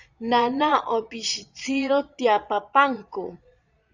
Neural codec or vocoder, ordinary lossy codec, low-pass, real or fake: vocoder, 22.05 kHz, 80 mel bands, Vocos; Opus, 64 kbps; 7.2 kHz; fake